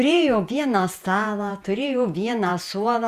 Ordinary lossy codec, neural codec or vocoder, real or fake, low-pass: Opus, 64 kbps; vocoder, 48 kHz, 128 mel bands, Vocos; fake; 14.4 kHz